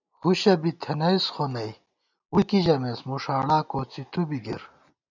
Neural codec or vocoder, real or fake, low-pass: none; real; 7.2 kHz